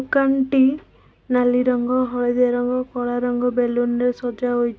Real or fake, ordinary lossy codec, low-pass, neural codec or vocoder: real; none; none; none